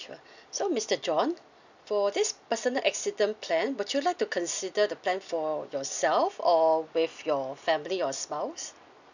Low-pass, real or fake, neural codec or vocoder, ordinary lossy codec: 7.2 kHz; real; none; none